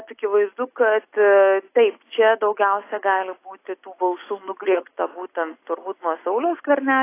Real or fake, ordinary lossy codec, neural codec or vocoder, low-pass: real; AAC, 24 kbps; none; 3.6 kHz